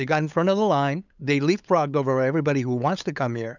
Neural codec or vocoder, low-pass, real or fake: codec, 16 kHz, 8 kbps, FunCodec, trained on LibriTTS, 25 frames a second; 7.2 kHz; fake